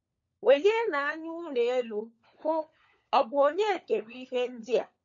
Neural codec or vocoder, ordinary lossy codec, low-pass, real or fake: codec, 16 kHz, 4 kbps, FunCodec, trained on LibriTTS, 50 frames a second; AAC, 64 kbps; 7.2 kHz; fake